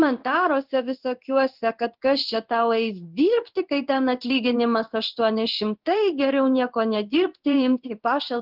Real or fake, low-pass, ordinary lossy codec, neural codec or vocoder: fake; 5.4 kHz; Opus, 24 kbps; codec, 16 kHz in and 24 kHz out, 1 kbps, XY-Tokenizer